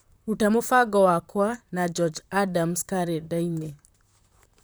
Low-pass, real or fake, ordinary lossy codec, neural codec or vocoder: none; fake; none; vocoder, 44.1 kHz, 128 mel bands, Pupu-Vocoder